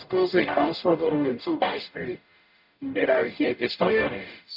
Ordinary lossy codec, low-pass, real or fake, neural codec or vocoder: none; 5.4 kHz; fake; codec, 44.1 kHz, 0.9 kbps, DAC